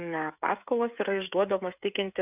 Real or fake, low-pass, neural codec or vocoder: fake; 3.6 kHz; codec, 16 kHz, 16 kbps, FreqCodec, smaller model